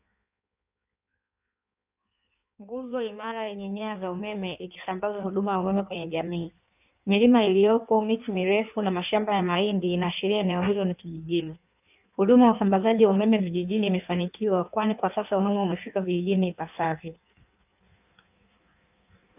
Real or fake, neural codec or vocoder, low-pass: fake; codec, 16 kHz in and 24 kHz out, 1.1 kbps, FireRedTTS-2 codec; 3.6 kHz